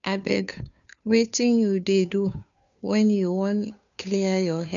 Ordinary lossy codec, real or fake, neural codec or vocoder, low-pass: none; fake; codec, 16 kHz, 2 kbps, FunCodec, trained on Chinese and English, 25 frames a second; 7.2 kHz